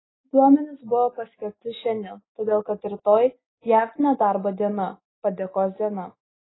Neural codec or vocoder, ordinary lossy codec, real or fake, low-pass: none; AAC, 16 kbps; real; 7.2 kHz